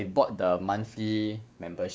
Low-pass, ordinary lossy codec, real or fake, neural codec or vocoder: none; none; fake; codec, 16 kHz, 4 kbps, X-Codec, WavLM features, trained on Multilingual LibriSpeech